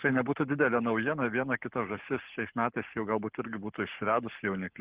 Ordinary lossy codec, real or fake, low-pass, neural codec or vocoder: Opus, 16 kbps; fake; 3.6 kHz; codec, 44.1 kHz, 7.8 kbps, Pupu-Codec